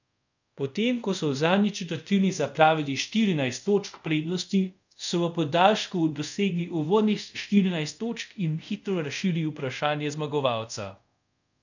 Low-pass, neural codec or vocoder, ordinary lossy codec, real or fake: 7.2 kHz; codec, 24 kHz, 0.5 kbps, DualCodec; none; fake